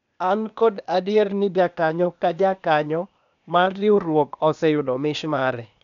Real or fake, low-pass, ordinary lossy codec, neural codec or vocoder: fake; 7.2 kHz; none; codec, 16 kHz, 0.8 kbps, ZipCodec